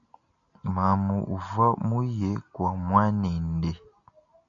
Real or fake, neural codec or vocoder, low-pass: real; none; 7.2 kHz